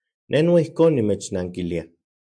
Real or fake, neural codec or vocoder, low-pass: real; none; 9.9 kHz